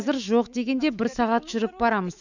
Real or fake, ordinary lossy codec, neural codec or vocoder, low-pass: fake; none; autoencoder, 48 kHz, 128 numbers a frame, DAC-VAE, trained on Japanese speech; 7.2 kHz